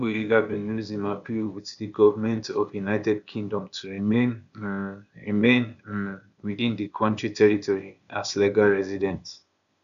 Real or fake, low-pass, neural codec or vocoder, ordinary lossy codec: fake; 7.2 kHz; codec, 16 kHz, 0.8 kbps, ZipCodec; none